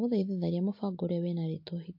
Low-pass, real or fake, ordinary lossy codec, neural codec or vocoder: 5.4 kHz; real; MP3, 32 kbps; none